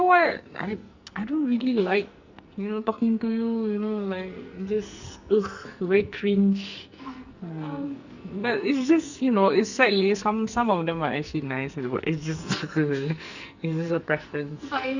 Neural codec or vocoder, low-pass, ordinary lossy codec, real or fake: codec, 44.1 kHz, 2.6 kbps, SNAC; 7.2 kHz; Opus, 64 kbps; fake